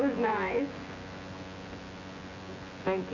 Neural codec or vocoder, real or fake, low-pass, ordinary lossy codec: vocoder, 24 kHz, 100 mel bands, Vocos; fake; 7.2 kHz; AAC, 32 kbps